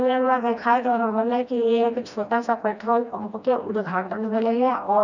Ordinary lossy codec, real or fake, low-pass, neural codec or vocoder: none; fake; 7.2 kHz; codec, 16 kHz, 1 kbps, FreqCodec, smaller model